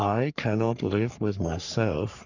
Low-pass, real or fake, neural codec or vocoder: 7.2 kHz; fake; codec, 44.1 kHz, 3.4 kbps, Pupu-Codec